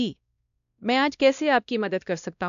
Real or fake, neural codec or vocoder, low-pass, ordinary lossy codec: fake; codec, 16 kHz, 1 kbps, X-Codec, WavLM features, trained on Multilingual LibriSpeech; 7.2 kHz; none